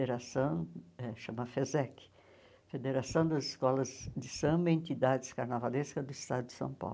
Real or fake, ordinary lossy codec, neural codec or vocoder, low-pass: real; none; none; none